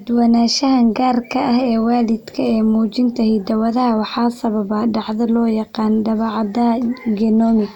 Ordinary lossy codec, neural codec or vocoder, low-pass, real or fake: none; none; 19.8 kHz; real